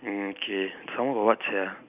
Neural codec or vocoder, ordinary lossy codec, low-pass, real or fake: none; none; 3.6 kHz; real